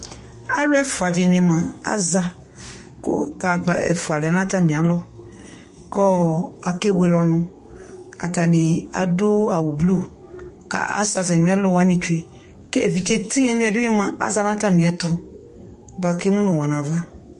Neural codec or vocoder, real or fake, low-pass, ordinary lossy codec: codec, 32 kHz, 1.9 kbps, SNAC; fake; 14.4 kHz; MP3, 48 kbps